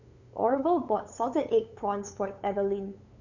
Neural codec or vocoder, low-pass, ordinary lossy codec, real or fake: codec, 16 kHz, 8 kbps, FunCodec, trained on LibriTTS, 25 frames a second; 7.2 kHz; none; fake